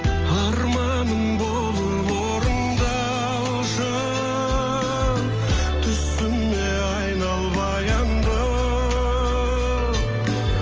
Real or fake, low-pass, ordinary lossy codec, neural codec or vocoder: real; 7.2 kHz; Opus, 24 kbps; none